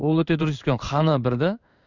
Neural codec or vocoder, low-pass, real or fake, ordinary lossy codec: codec, 16 kHz in and 24 kHz out, 1 kbps, XY-Tokenizer; 7.2 kHz; fake; none